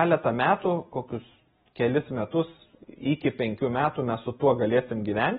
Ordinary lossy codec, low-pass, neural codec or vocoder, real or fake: AAC, 16 kbps; 19.8 kHz; none; real